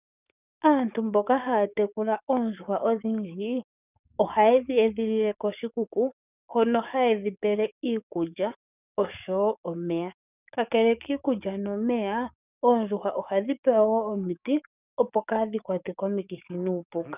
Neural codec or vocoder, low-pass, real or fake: codec, 44.1 kHz, 7.8 kbps, DAC; 3.6 kHz; fake